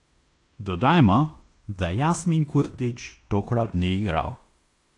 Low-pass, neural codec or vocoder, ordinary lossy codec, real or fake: 10.8 kHz; codec, 16 kHz in and 24 kHz out, 0.9 kbps, LongCat-Audio-Codec, fine tuned four codebook decoder; AAC, 48 kbps; fake